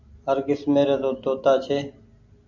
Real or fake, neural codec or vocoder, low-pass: real; none; 7.2 kHz